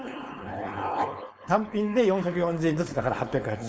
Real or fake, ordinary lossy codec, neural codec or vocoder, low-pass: fake; none; codec, 16 kHz, 4.8 kbps, FACodec; none